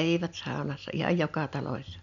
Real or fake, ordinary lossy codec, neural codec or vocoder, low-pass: real; none; none; 7.2 kHz